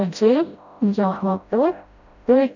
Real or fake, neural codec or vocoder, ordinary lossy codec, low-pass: fake; codec, 16 kHz, 0.5 kbps, FreqCodec, smaller model; none; 7.2 kHz